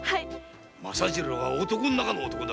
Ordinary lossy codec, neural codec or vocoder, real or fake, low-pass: none; none; real; none